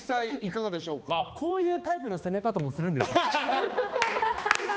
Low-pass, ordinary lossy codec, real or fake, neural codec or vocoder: none; none; fake; codec, 16 kHz, 2 kbps, X-Codec, HuBERT features, trained on balanced general audio